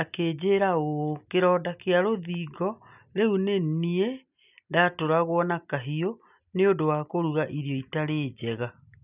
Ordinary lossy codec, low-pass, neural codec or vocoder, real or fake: none; 3.6 kHz; none; real